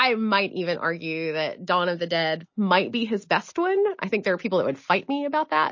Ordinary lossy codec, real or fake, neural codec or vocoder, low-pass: MP3, 32 kbps; real; none; 7.2 kHz